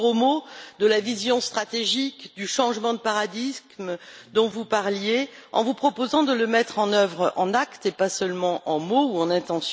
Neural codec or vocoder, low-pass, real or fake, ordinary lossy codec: none; none; real; none